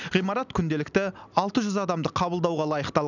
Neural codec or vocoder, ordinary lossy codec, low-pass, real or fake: none; none; 7.2 kHz; real